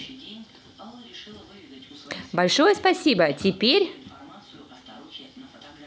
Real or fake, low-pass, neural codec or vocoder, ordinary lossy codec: real; none; none; none